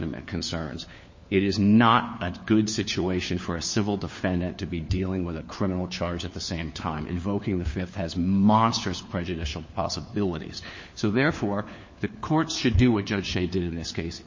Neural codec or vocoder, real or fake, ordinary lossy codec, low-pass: codec, 16 kHz, 4 kbps, FunCodec, trained on LibriTTS, 50 frames a second; fake; MP3, 32 kbps; 7.2 kHz